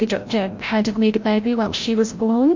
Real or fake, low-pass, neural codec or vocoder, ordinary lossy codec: fake; 7.2 kHz; codec, 16 kHz, 0.5 kbps, FreqCodec, larger model; MP3, 48 kbps